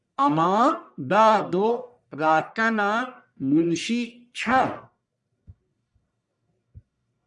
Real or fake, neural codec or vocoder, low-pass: fake; codec, 44.1 kHz, 1.7 kbps, Pupu-Codec; 10.8 kHz